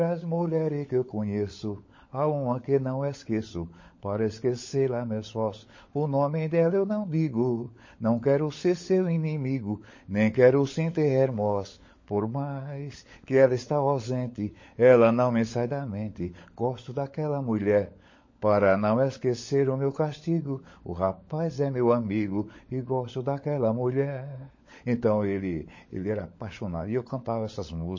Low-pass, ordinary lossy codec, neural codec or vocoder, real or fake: 7.2 kHz; MP3, 32 kbps; codec, 16 kHz, 16 kbps, FunCodec, trained on LibriTTS, 50 frames a second; fake